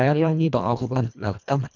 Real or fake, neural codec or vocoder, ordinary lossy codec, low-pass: fake; codec, 24 kHz, 1.5 kbps, HILCodec; none; 7.2 kHz